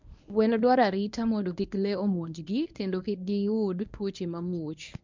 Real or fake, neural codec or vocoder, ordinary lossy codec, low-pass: fake; codec, 24 kHz, 0.9 kbps, WavTokenizer, medium speech release version 1; MP3, 64 kbps; 7.2 kHz